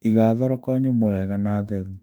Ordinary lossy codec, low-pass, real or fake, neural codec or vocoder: none; 19.8 kHz; fake; autoencoder, 48 kHz, 32 numbers a frame, DAC-VAE, trained on Japanese speech